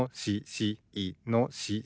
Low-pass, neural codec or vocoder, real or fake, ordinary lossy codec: none; none; real; none